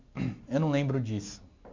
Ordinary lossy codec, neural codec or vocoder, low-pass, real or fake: MP3, 48 kbps; none; 7.2 kHz; real